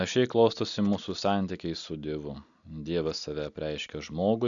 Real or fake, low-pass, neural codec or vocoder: real; 7.2 kHz; none